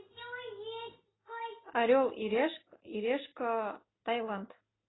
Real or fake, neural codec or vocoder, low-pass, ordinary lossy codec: real; none; 7.2 kHz; AAC, 16 kbps